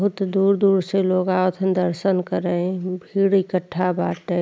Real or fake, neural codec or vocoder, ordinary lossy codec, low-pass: real; none; none; none